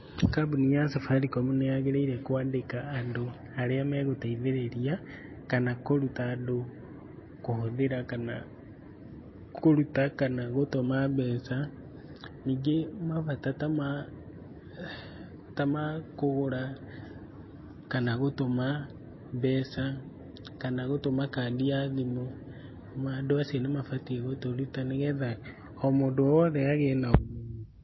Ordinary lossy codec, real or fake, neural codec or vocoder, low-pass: MP3, 24 kbps; real; none; 7.2 kHz